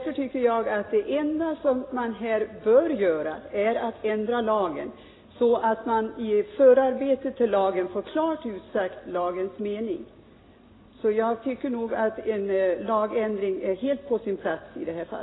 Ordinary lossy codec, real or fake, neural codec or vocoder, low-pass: AAC, 16 kbps; real; none; 7.2 kHz